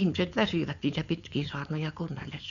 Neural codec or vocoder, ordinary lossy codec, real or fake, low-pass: codec, 16 kHz, 4.8 kbps, FACodec; none; fake; 7.2 kHz